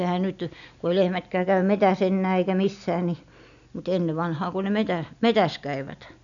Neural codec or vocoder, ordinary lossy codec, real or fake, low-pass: none; none; real; 7.2 kHz